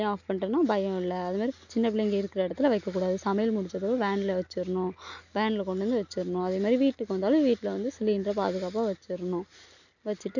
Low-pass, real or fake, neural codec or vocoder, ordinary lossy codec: 7.2 kHz; real; none; none